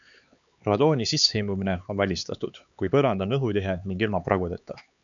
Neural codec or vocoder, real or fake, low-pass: codec, 16 kHz, 4 kbps, X-Codec, HuBERT features, trained on LibriSpeech; fake; 7.2 kHz